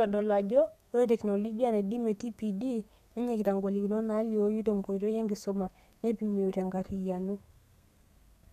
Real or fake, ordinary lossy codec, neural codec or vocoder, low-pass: fake; none; codec, 32 kHz, 1.9 kbps, SNAC; 14.4 kHz